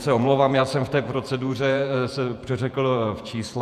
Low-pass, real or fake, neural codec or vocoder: 14.4 kHz; fake; vocoder, 48 kHz, 128 mel bands, Vocos